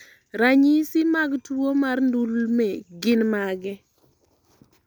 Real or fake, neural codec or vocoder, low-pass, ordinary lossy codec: real; none; none; none